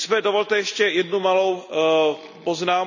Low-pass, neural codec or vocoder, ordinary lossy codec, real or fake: 7.2 kHz; none; none; real